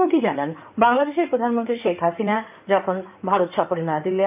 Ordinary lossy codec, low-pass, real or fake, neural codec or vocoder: none; 3.6 kHz; fake; codec, 16 kHz in and 24 kHz out, 2.2 kbps, FireRedTTS-2 codec